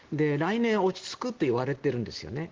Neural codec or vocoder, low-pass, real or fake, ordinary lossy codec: none; 7.2 kHz; real; Opus, 16 kbps